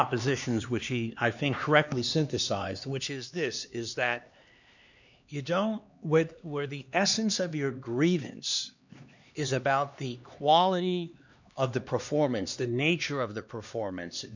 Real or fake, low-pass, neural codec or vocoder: fake; 7.2 kHz; codec, 16 kHz, 2 kbps, X-Codec, HuBERT features, trained on LibriSpeech